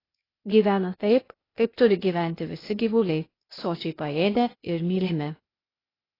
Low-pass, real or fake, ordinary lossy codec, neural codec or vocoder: 5.4 kHz; fake; AAC, 24 kbps; codec, 16 kHz, 0.8 kbps, ZipCodec